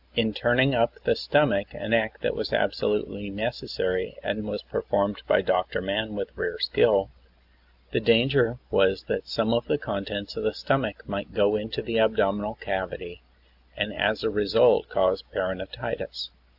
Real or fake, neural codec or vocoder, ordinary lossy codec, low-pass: real; none; AAC, 48 kbps; 5.4 kHz